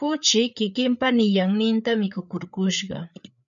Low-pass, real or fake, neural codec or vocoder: 7.2 kHz; fake; codec, 16 kHz, 4 kbps, FreqCodec, larger model